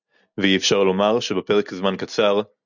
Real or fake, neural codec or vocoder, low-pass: real; none; 7.2 kHz